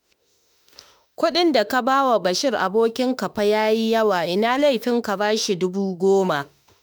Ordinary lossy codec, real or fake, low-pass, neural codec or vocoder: none; fake; none; autoencoder, 48 kHz, 32 numbers a frame, DAC-VAE, trained on Japanese speech